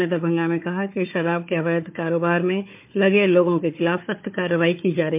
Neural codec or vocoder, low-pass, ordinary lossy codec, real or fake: codec, 16 kHz, 4 kbps, FunCodec, trained on Chinese and English, 50 frames a second; 3.6 kHz; MP3, 32 kbps; fake